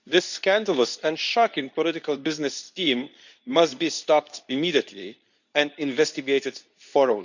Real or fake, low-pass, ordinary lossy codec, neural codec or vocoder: fake; 7.2 kHz; none; codec, 24 kHz, 0.9 kbps, WavTokenizer, medium speech release version 2